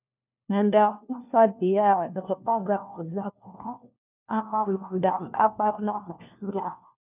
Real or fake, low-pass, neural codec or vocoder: fake; 3.6 kHz; codec, 16 kHz, 1 kbps, FunCodec, trained on LibriTTS, 50 frames a second